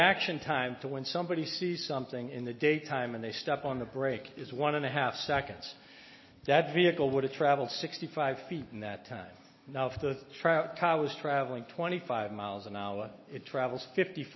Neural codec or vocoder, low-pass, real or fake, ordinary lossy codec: none; 7.2 kHz; real; MP3, 24 kbps